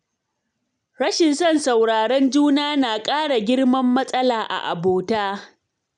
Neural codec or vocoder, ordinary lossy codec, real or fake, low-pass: none; none; real; 9.9 kHz